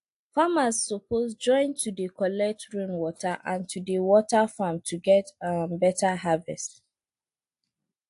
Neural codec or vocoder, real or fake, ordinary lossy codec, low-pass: none; real; AAC, 64 kbps; 10.8 kHz